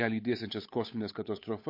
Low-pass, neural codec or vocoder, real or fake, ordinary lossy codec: 5.4 kHz; codec, 16 kHz, 8 kbps, FunCodec, trained on Chinese and English, 25 frames a second; fake; MP3, 32 kbps